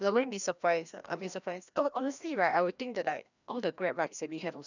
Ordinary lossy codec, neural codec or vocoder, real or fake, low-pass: none; codec, 16 kHz, 1 kbps, FreqCodec, larger model; fake; 7.2 kHz